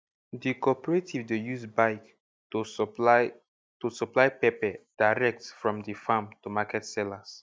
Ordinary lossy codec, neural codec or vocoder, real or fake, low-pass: none; none; real; none